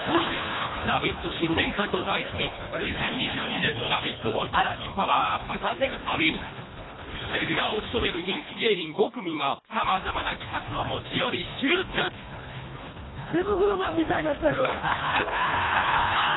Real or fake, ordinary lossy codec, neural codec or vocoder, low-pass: fake; AAC, 16 kbps; codec, 24 kHz, 1.5 kbps, HILCodec; 7.2 kHz